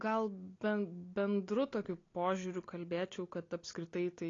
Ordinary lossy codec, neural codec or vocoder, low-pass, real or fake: AAC, 32 kbps; none; 7.2 kHz; real